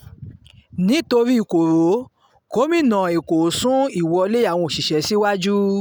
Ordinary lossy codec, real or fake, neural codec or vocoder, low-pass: none; real; none; none